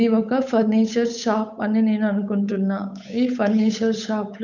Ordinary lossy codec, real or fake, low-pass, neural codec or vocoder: none; fake; 7.2 kHz; codec, 16 kHz, 8 kbps, FunCodec, trained on Chinese and English, 25 frames a second